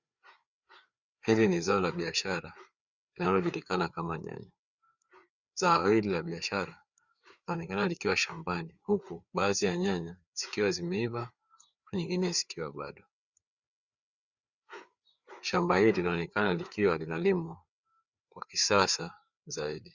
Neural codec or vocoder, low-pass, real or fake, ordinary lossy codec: codec, 16 kHz, 4 kbps, FreqCodec, larger model; 7.2 kHz; fake; Opus, 64 kbps